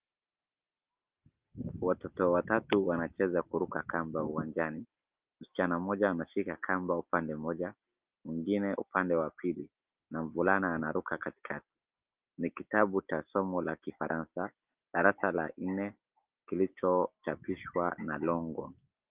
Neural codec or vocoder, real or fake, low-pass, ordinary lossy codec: none; real; 3.6 kHz; Opus, 32 kbps